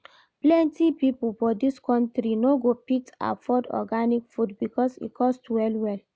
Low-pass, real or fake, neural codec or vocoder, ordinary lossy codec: none; real; none; none